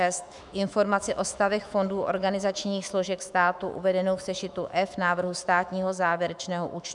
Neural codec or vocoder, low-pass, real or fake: autoencoder, 48 kHz, 128 numbers a frame, DAC-VAE, trained on Japanese speech; 10.8 kHz; fake